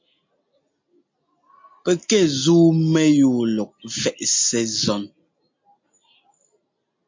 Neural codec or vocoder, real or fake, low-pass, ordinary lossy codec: none; real; 7.2 kHz; MP3, 48 kbps